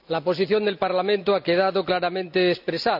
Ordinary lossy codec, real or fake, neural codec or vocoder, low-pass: none; real; none; 5.4 kHz